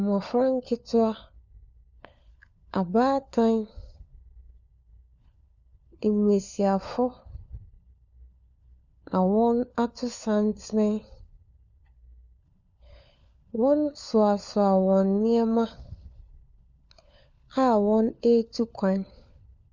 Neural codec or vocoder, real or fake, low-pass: codec, 16 kHz, 4 kbps, FunCodec, trained on LibriTTS, 50 frames a second; fake; 7.2 kHz